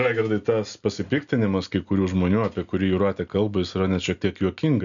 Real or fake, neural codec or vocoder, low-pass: real; none; 7.2 kHz